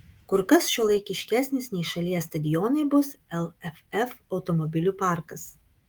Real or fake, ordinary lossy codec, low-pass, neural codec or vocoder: fake; Opus, 24 kbps; 19.8 kHz; autoencoder, 48 kHz, 128 numbers a frame, DAC-VAE, trained on Japanese speech